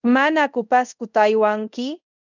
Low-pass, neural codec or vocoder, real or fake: 7.2 kHz; codec, 24 kHz, 0.5 kbps, DualCodec; fake